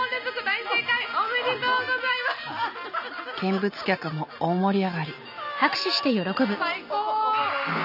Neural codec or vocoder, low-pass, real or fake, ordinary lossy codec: none; 5.4 kHz; real; none